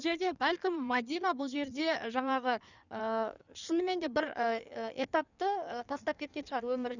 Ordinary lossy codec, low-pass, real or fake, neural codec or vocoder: none; 7.2 kHz; fake; codec, 16 kHz in and 24 kHz out, 1.1 kbps, FireRedTTS-2 codec